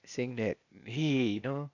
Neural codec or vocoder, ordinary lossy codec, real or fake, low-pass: codec, 16 kHz, 0.8 kbps, ZipCodec; none; fake; 7.2 kHz